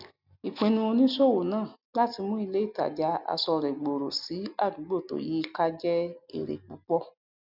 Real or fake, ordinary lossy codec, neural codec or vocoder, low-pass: real; none; none; 5.4 kHz